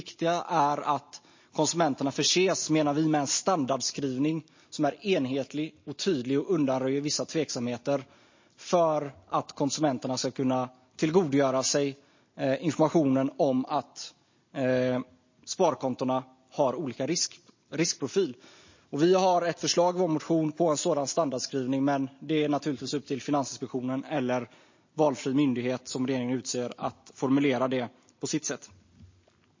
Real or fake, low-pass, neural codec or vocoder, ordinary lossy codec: real; 7.2 kHz; none; MP3, 32 kbps